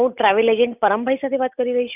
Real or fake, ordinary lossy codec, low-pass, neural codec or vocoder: real; none; 3.6 kHz; none